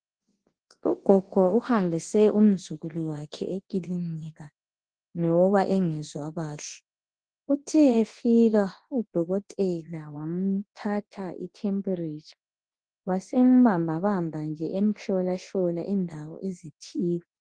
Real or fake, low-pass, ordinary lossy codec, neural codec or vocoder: fake; 9.9 kHz; Opus, 16 kbps; codec, 24 kHz, 0.9 kbps, WavTokenizer, large speech release